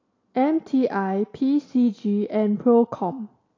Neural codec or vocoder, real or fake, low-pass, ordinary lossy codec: none; real; 7.2 kHz; AAC, 32 kbps